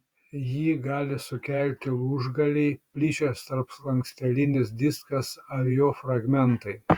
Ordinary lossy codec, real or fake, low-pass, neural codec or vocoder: Opus, 64 kbps; fake; 19.8 kHz; vocoder, 48 kHz, 128 mel bands, Vocos